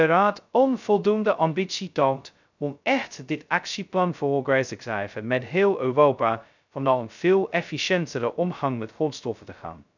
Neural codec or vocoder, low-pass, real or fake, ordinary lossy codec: codec, 16 kHz, 0.2 kbps, FocalCodec; 7.2 kHz; fake; none